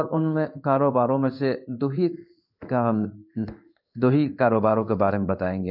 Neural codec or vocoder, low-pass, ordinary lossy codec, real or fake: codec, 16 kHz in and 24 kHz out, 1 kbps, XY-Tokenizer; 5.4 kHz; none; fake